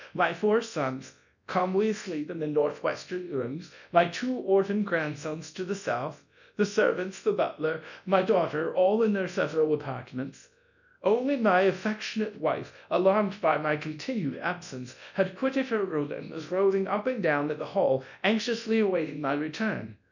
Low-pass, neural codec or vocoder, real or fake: 7.2 kHz; codec, 24 kHz, 0.9 kbps, WavTokenizer, large speech release; fake